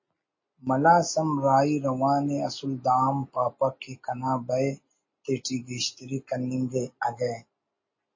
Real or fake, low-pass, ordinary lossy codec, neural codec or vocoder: real; 7.2 kHz; MP3, 32 kbps; none